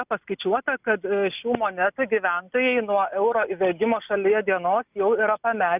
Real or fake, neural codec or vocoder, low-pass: real; none; 3.6 kHz